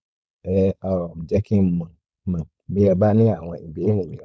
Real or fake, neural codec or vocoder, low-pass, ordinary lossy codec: fake; codec, 16 kHz, 4.8 kbps, FACodec; none; none